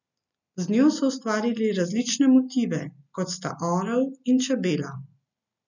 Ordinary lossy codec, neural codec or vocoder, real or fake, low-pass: none; none; real; 7.2 kHz